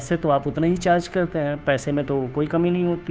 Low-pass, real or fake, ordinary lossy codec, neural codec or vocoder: none; fake; none; codec, 16 kHz, 2 kbps, FunCodec, trained on Chinese and English, 25 frames a second